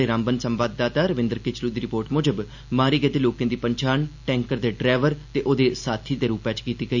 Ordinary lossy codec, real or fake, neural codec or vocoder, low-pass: none; real; none; 7.2 kHz